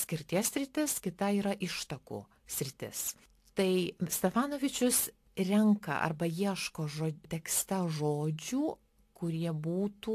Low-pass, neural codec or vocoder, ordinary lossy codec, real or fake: 14.4 kHz; none; AAC, 64 kbps; real